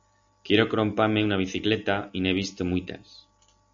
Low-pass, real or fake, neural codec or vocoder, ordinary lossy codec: 7.2 kHz; real; none; AAC, 64 kbps